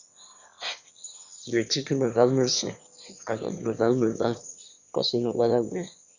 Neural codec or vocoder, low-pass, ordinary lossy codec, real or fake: autoencoder, 22.05 kHz, a latent of 192 numbers a frame, VITS, trained on one speaker; 7.2 kHz; Opus, 64 kbps; fake